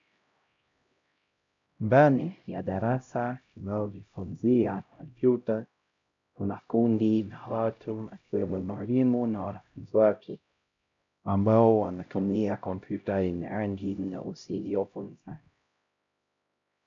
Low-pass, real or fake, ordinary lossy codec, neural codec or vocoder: 7.2 kHz; fake; MP3, 64 kbps; codec, 16 kHz, 0.5 kbps, X-Codec, HuBERT features, trained on LibriSpeech